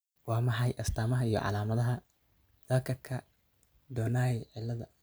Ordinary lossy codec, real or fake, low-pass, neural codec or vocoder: none; real; none; none